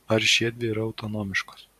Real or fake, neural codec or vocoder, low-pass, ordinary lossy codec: fake; vocoder, 44.1 kHz, 128 mel bands every 512 samples, BigVGAN v2; 14.4 kHz; MP3, 96 kbps